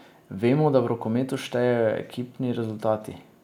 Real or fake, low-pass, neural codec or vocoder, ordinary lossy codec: real; 19.8 kHz; none; none